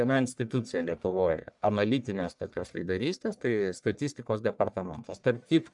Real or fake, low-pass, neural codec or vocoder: fake; 10.8 kHz; codec, 44.1 kHz, 1.7 kbps, Pupu-Codec